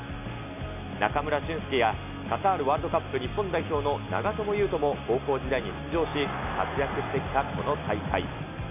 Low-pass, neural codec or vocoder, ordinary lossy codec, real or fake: 3.6 kHz; none; none; real